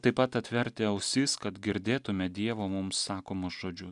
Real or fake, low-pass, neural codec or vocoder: real; 10.8 kHz; none